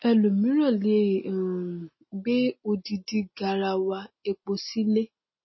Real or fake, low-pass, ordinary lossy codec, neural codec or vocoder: real; 7.2 kHz; MP3, 24 kbps; none